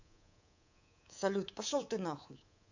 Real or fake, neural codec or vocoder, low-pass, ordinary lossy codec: fake; codec, 24 kHz, 3.1 kbps, DualCodec; 7.2 kHz; AAC, 48 kbps